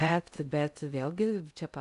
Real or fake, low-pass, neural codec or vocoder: fake; 10.8 kHz; codec, 16 kHz in and 24 kHz out, 0.6 kbps, FocalCodec, streaming, 2048 codes